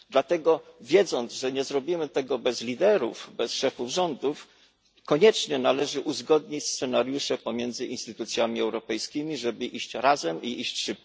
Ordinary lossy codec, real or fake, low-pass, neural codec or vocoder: none; real; none; none